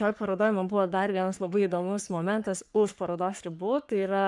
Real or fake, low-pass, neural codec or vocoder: fake; 10.8 kHz; codec, 44.1 kHz, 3.4 kbps, Pupu-Codec